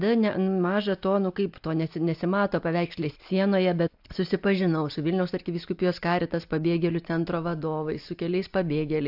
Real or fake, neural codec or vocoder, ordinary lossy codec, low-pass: real; none; AAC, 48 kbps; 5.4 kHz